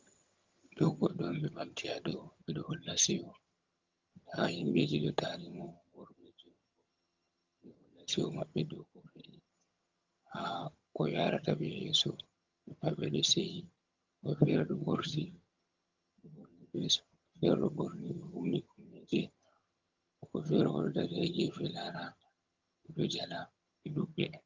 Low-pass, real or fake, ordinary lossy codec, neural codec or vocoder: 7.2 kHz; fake; Opus, 32 kbps; vocoder, 22.05 kHz, 80 mel bands, HiFi-GAN